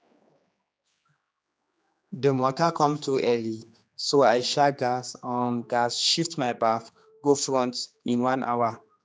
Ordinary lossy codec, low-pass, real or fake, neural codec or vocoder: none; none; fake; codec, 16 kHz, 2 kbps, X-Codec, HuBERT features, trained on general audio